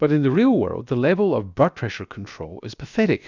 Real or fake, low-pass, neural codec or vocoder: fake; 7.2 kHz; codec, 16 kHz, about 1 kbps, DyCAST, with the encoder's durations